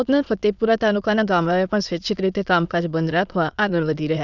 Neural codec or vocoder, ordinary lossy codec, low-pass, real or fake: autoencoder, 22.05 kHz, a latent of 192 numbers a frame, VITS, trained on many speakers; none; 7.2 kHz; fake